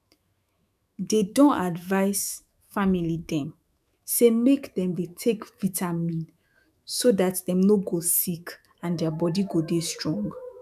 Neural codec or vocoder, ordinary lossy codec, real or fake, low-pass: autoencoder, 48 kHz, 128 numbers a frame, DAC-VAE, trained on Japanese speech; none; fake; 14.4 kHz